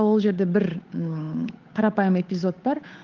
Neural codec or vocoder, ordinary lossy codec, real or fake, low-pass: codec, 16 kHz, 8 kbps, FunCodec, trained on Chinese and English, 25 frames a second; Opus, 32 kbps; fake; 7.2 kHz